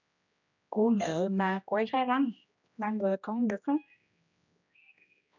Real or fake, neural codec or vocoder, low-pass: fake; codec, 16 kHz, 1 kbps, X-Codec, HuBERT features, trained on general audio; 7.2 kHz